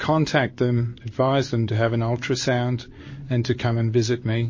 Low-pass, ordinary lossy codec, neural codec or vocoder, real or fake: 7.2 kHz; MP3, 32 kbps; codec, 16 kHz in and 24 kHz out, 1 kbps, XY-Tokenizer; fake